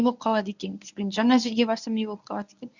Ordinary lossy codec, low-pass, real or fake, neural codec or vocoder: none; 7.2 kHz; fake; codec, 24 kHz, 0.9 kbps, WavTokenizer, medium speech release version 1